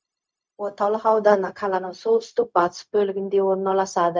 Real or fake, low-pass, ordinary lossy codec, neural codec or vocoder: fake; none; none; codec, 16 kHz, 0.4 kbps, LongCat-Audio-Codec